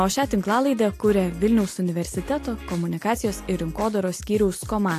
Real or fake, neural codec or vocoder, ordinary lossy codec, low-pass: real; none; AAC, 64 kbps; 14.4 kHz